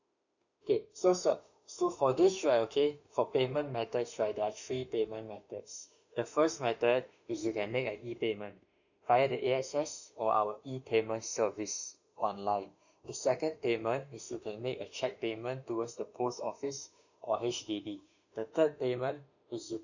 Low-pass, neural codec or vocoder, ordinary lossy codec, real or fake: 7.2 kHz; autoencoder, 48 kHz, 32 numbers a frame, DAC-VAE, trained on Japanese speech; none; fake